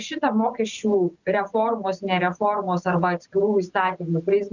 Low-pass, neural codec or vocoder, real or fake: 7.2 kHz; none; real